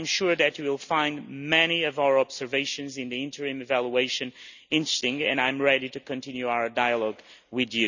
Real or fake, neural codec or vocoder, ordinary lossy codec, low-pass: real; none; none; 7.2 kHz